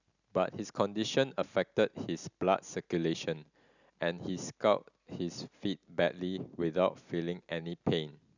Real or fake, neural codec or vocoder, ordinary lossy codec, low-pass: real; none; none; 7.2 kHz